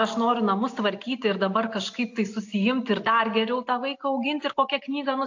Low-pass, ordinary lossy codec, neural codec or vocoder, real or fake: 7.2 kHz; AAC, 48 kbps; none; real